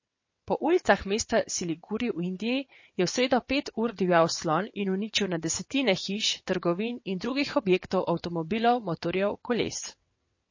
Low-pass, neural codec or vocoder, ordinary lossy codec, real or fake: 7.2 kHz; vocoder, 22.05 kHz, 80 mel bands, WaveNeXt; MP3, 32 kbps; fake